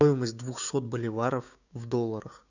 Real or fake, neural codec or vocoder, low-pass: fake; codec, 16 kHz, 6 kbps, DAC; 7.2 kHz